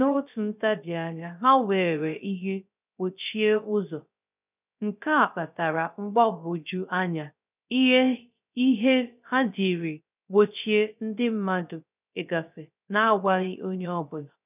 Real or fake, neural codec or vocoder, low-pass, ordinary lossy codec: fake; codec, 16 kHz, 0.3 kbps, FocalCodec; 3.6 kHz; none